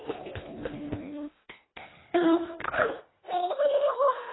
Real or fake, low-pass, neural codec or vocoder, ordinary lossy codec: fake; 7.2 kHz; codec, 24 kHz, 1.5 kbps, HILCodec; AAC, 16 kbps